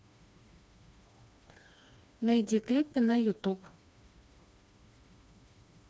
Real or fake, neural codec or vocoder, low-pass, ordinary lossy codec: fake; codec, 16 kHz, 2 kbps, FreqCodec, smaller model; none; none